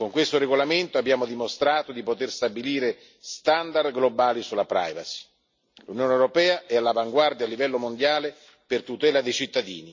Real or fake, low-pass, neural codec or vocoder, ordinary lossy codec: real; 7.2 kHz; none; MP3, 48 kbps